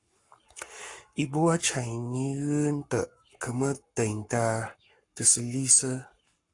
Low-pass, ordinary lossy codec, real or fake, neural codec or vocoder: 10.8 kHz; AAC, 48 kbps; fake; codec, 44.1 kHz, 7.8 kbps, Pupu-Codec